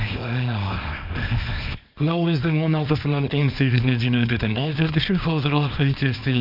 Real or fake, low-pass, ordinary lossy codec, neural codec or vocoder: fake; 5.4 kHz; none; codec, 24 kHz, 0.9 kbps, WavTokenizer, small release